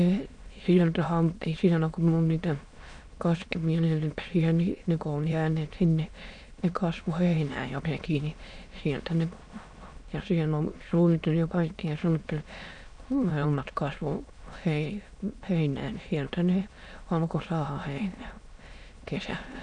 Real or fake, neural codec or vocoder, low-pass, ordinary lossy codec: fake; autoencoder, 22.05 kHz, a latent of 192 numbers a frame, VITS, trained on many speakers; 9.9 kHz; none